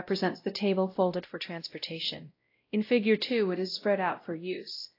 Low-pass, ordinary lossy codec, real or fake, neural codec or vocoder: 5.4 kHz; AAC, 32 kbps; fake; codec, 16 kHz, 0.5 kbps, X-Codec, WavLM features, trained on Multilingual LibriSpeech